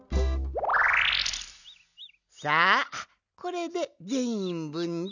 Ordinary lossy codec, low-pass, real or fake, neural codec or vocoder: none; 7.2 kHz; real; none